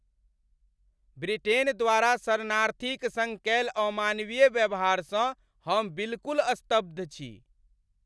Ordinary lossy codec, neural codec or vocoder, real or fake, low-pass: none; none; real; none